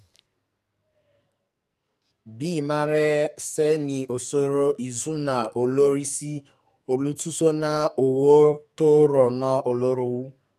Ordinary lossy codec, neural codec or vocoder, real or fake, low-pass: MP3, 96 kbps; codec, 44.1 kHz, 2.6 kbps, SNAC; fake; 14.4 kHz